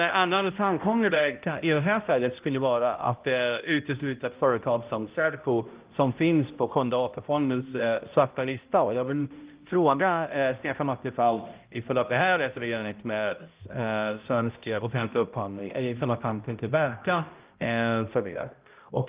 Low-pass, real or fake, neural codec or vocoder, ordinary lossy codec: 3.6 kHz; fake; codec, 16 kHz, 0.5 kbps, X-Codec, HuBERT features, trained on balanced general audio; Opus, 24 kbps